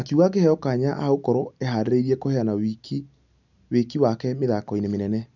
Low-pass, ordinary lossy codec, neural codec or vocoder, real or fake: 7.2 kHz; none; none; real